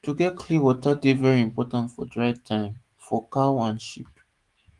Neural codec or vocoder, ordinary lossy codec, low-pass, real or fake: autoencoder, 48 kHz, 128 numbers a frame, DAC-VAE, trained on Japanese speech; Opus, 24 kbps; 10.8 kHz; fake